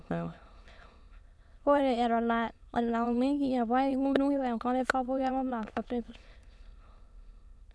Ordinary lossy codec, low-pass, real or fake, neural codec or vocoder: none; none; fake; autoencoder, 22.05 kHz, a latent of 192 numbers a frame, VITS, trained on many speakers